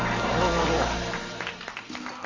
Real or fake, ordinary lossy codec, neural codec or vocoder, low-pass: real; AAC, 32 kbps; none; 7.2 kHz